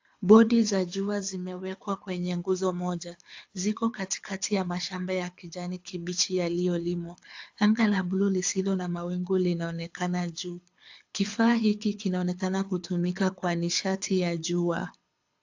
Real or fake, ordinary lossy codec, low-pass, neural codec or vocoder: fake; AAC, 48 kbps; 7.2 kHz; codec, 24 kHz, 6 kbps, HILCodec